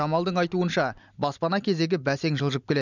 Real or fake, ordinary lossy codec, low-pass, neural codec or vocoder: real; none; 7.2 kHz; none